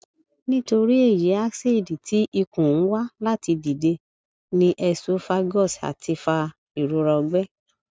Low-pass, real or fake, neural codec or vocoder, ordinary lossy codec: none; real; none; none